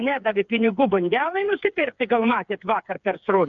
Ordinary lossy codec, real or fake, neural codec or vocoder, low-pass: MP3, 64 kbps; fake; codec, 16 kHz, 4 kbps, FreqCodec, smaller model; 7.2 kHz